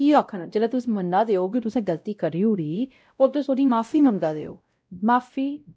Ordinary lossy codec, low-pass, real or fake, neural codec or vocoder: none; none; fake; codec, 16 kHz, 0.5 kbps, X-Codec, WavLM features, trained on Multilingual LibriSpeech